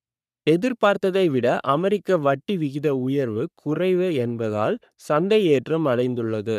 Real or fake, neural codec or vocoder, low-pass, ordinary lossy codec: fake; codec, 44.1 kHz, 3.4 kbps, Pupu-Codec; 14.4 kHz; none